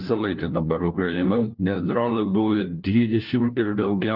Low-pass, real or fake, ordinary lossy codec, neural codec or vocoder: 5.4 kHz; fake; Opus, 32 kbps; codec, 16 kHz, 1 kbps, FunCodec, trained on LibriTTS, 50 frames a second